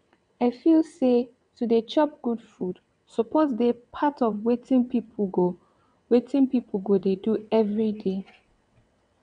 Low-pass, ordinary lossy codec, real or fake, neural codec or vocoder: 9.9 kHz; none; fake; vocoder, 22.05 kHz, 80 mel bands, WaveNeXt